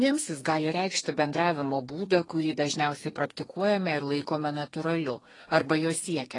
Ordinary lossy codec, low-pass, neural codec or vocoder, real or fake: AAC, 32 kbps; 10.8 kHz; codec, 32 kHz, 1.9 kbps, SNAC; fake